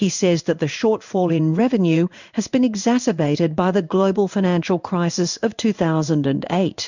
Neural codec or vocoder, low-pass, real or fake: codec, 16 kHz in and 24 kHz out, 1 kbps, XY-Tokenizer; 7.2 kHz; fake